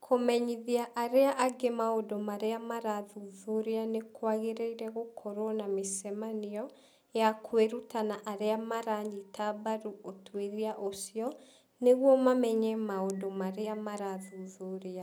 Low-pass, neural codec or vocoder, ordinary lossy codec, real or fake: none; none; none; real